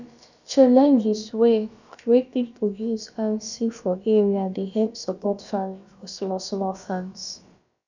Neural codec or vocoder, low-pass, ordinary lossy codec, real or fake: codec, 16 kHz, about 1 kbps, DyCAST, with the encoder's durations; 7.2 kHz; none; fake